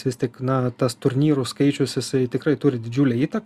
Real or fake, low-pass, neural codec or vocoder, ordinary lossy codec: real; 14.4 kHz; none; Opus, 64 kbps